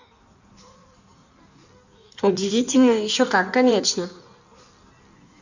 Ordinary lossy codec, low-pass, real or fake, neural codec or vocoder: none; 7.2 kHz; fake; codec, 16 kHz in and 24 kHz out, 1.1 kbps, FireRedTTS-2 codec